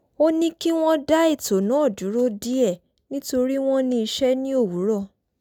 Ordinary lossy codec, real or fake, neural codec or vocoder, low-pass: none; real; none; 19.8 kHz